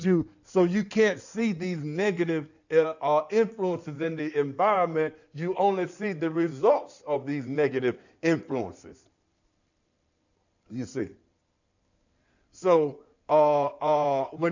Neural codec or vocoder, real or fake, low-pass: codec, 16 kHz in and 24 kHz out, 2.2 kbps, FireRedTTS-2 codec; fake; 7.2 kHz